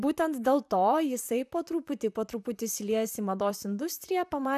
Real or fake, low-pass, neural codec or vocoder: fake; 14.4 kHz; vocoder, 44.1 kHz, 128 mel bands, Pupu-Vocoder